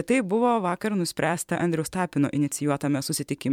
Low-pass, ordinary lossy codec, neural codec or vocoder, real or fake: 19.8 kHz; MP3, 96 kbps; vocoder, 44.1 kHz, 128 mel bands every 512 samples, BigVGAN v2; fake